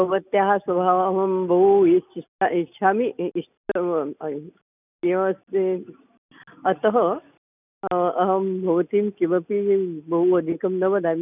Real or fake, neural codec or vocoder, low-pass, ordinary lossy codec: real; none; 3.6 kHz; none